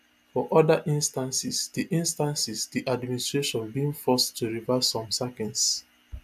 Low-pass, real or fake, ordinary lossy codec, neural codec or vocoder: 14.4 kHz; real; AAC, 96 kbps; none